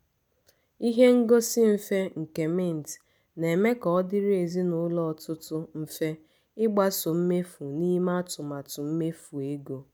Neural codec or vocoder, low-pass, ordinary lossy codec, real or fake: none; none; none; real